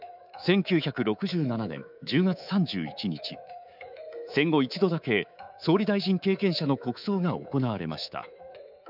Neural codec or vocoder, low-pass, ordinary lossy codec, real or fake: codec, 24 kHz, 3.1 kbps, DualCodec; 5.4 kHz; none; fake